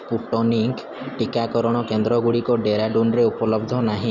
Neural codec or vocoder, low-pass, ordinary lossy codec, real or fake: none; 7.2 kHz; none; real